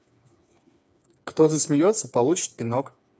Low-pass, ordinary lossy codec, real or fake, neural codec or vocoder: none; none; fake; codec, 16 kHz, 4 kbps, FreqCodec, smaller model